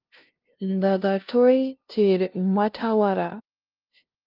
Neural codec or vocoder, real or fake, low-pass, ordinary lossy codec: codec, 16 kHz, 0.5 kbps, FunCodec, trained on LibriTTS, 25 frames a second; fake; 5.4 kHz; Opus, 32 kbps